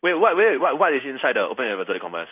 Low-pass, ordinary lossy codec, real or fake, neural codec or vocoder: 3.6 kHz; none; fake; codec, 16 kHz in and 24 kHz out, 1 kbps, XY-Tokenizer